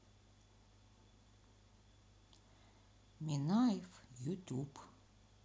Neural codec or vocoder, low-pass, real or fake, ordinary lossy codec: none; none; real; none